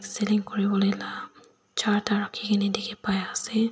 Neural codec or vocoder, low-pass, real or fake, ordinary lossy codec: none; none; real; none